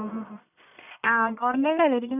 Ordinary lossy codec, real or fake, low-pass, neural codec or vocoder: none; fake; 3.6 kHz; codec, 44.1 kHz, 1.7 kbps, Pupu-Codec